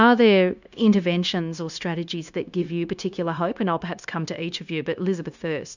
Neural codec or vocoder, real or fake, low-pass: codec, 16 kHz, 0.9 kbps, LongCat-Audio-Codec; fake; 7.2 kHz